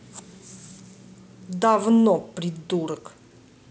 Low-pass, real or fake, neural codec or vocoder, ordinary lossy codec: none; real; none; none